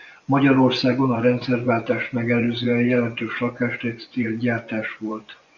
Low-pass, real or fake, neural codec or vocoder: 7.2 kHz; fake; vocoder, 24 kHz, 100 mel bands, Vocos